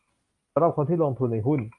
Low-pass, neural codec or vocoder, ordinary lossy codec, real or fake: 10.8 kHz; none; Opus, 32 kbps; real